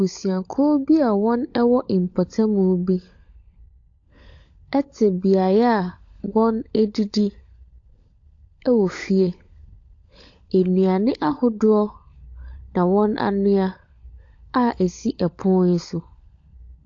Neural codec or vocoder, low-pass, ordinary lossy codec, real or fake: codec, 16 kHz, 16 kbps, FunCodec, trained on LibriTTS, 50 frames a second; 7.2 kHz; AAC, 48 kbps; fake